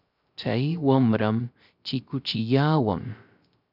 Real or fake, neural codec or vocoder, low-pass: fake; codec, 16 kHz, 0.3 kbps, FocalCodec; 5.4 kHz